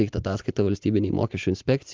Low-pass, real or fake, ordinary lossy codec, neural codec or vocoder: 7.2 kHz; real; Opus, 32 kbps; none